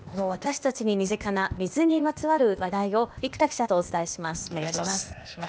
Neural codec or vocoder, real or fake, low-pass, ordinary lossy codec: codec, 16 kHz, 0.8 kbps, ZipCodec; fake; none; none